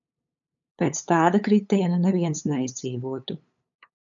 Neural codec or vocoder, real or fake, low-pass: codec, 16 kHz, 8 kbps, FunCodec, trained on LibriTTS, 25 frames a second; fake; 7.2 kHz